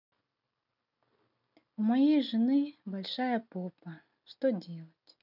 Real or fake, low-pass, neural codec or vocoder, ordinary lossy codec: real; 5.4 kHz; none; none